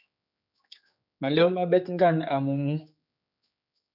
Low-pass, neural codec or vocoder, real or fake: 5.4 kHz; codec, 16 kHz, 4 kbps, X-Codec, HuBERT features, trained on general audio; fake